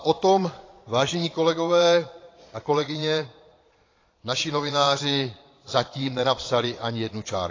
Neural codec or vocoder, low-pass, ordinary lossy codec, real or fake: vocoder, 22.05 kHz, 80 mel bands, Vocos; 7.2 kHz; AAC, 32 kbps; fake